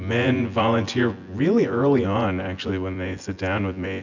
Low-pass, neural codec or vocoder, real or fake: 7.2 kHz; vocoder, 24 kHz, 100 mel bands, Vocos; fake